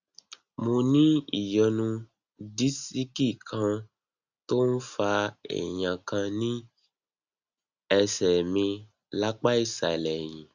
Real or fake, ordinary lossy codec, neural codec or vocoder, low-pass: real; Opus, 64 kbps; none; 7.2 kHz